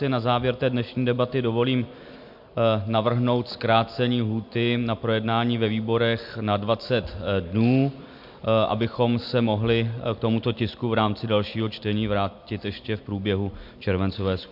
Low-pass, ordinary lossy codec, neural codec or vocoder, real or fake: 5.4 kHz; MP3, 48 kbps; none; real